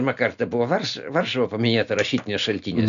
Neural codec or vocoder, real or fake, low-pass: none; real; 7.2 kHz